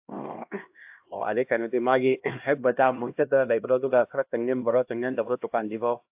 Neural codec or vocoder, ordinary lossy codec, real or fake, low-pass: codec, 16 kHz, 1 kbps, X-Codec, HuBERT features, trained on LibriSpeech; AAC, 32 kbps; fake; 3.6 kHz